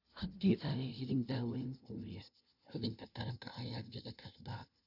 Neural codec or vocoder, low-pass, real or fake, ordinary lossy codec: codec, 16 kHz, 0.5 kbps, FunCodec, trained on LibriTTS, 25 frames a second; 5.4 kHz; fake; none